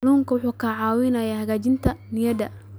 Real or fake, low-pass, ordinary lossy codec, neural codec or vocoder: real; none; none; none